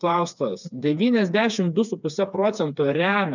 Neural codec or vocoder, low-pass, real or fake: codec, 16 kHz, 4 kbps, FreqCodec, smaller model; 7.2 kHz; fake